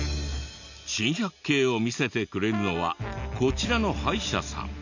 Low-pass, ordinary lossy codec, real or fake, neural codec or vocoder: 7.2 kHz; none; real; none